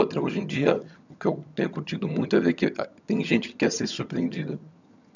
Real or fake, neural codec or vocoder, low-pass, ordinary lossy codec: fake; vocoder, 22.05 kHz, 80 mel bands, HiFi-GAN; 7.2 kHz; none